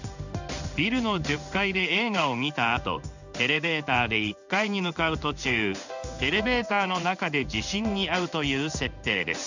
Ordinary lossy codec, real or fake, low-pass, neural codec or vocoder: none; fake; 7.2 kHz; codec, 16 kHz in and 24 kHz out, 1 kbps, XY-Tokenizer